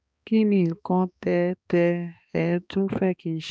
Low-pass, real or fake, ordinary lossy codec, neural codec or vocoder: none; fake; none; codec, 16 kHz, 2 kbps, X-Codec, HuBERT features, trained on balanced general audio